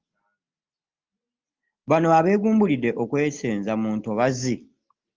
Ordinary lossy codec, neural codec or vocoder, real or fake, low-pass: Opus, 32 kbps; none; real; 7.2 kHz